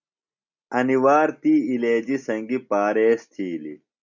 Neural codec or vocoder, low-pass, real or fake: none; 7.2 kHz; real